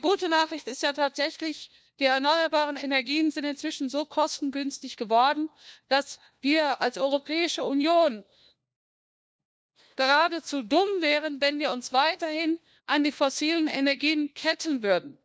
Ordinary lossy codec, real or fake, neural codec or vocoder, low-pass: none; fake; codec, 16 kHz, 1 kbps, FunCodec, trained on LibriTTS, 50 frames a second; none